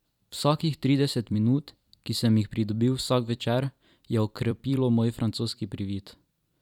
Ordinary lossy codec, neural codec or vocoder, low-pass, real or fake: none; vocoder, 44.1 kHz, 128 mel bands every 256 samples, BigVGAN v2; 19.8 kHz; fake